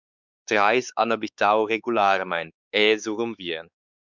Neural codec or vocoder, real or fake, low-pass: codec, 16 kHz, 4 kbps, X-Codec, WavLM features, trained on Multilingual LibriSpeech; fake; 7.2 kHz